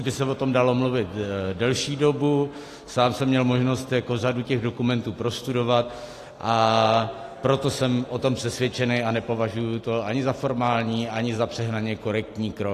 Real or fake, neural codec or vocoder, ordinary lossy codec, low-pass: real; none; AAC, 48 kbps; 14.4 kHz